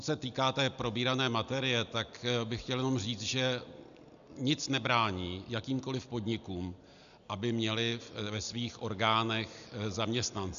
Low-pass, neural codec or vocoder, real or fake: 7.2 kHz; none; real